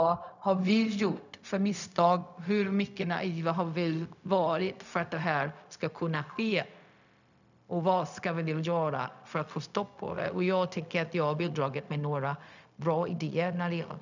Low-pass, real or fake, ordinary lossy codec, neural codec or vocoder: 7.2 kHz; fake; none; codec, 16 kHz, 0.4 kbps, LongCat-Audio-Codec